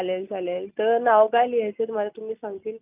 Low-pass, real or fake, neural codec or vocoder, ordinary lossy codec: 3.6 kHz; real; none; none